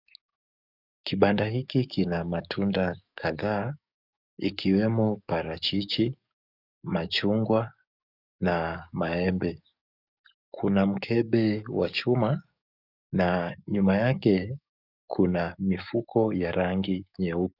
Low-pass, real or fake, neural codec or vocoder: 5.4 kHz; fake; codec, 44.1 kHz, 7.8 kbps, DAC